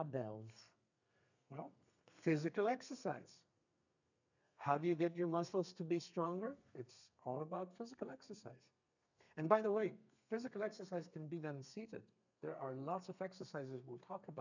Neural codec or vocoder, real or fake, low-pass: codec, 32 kHz, 1.9 kbps, SNAC; fake; 7.2 kHz